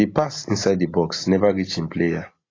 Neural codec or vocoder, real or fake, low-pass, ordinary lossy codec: none; real; 7.2 kHz; AAC, 32 kbps